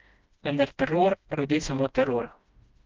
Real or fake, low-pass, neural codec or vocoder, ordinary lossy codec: fake; 7.2 kHz; codec, 16 kHz, 1 kbps, FreqCodec, smaller model; Opus, 24 kbps